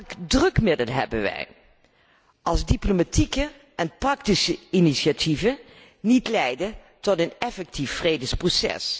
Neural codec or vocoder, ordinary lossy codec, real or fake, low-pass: none; none; real; none